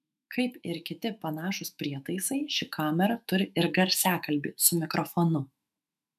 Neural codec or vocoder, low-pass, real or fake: autoencoder, 48 kHz, 128 numbers a frame, DAC-VAE, trained on Japanese speech; 14.4 kHz; fake